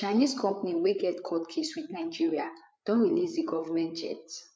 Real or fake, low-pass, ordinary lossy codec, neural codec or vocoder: fake; none; none; codec, 16 kHz, 8 kbps, FreqCodec, larger model